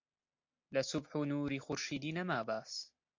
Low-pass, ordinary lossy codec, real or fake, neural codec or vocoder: 7.2 kHz; MP3, 48 kbps; real; none